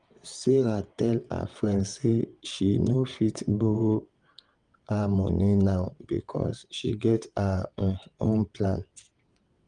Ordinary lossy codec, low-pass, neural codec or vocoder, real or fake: Opus, 32 kbps; 9.9 kHz; vocoder, 22.05 kHz, 80 mel bands, WaveNeXt; fake